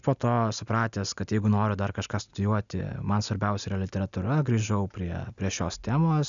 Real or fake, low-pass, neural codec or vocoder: real; 7.2 kHz; none